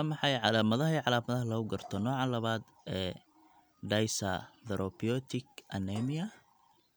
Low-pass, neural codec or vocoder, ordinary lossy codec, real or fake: none; none; none; real